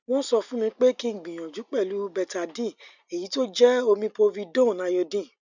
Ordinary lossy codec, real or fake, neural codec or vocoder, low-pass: none; real; none; 7.2 kHz